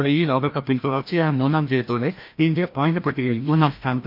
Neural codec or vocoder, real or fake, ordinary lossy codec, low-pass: codec, 16 kHz, 1 kbps, FreqCodec, larger model; fake; MP3, 48 kbps; 5.4 kHz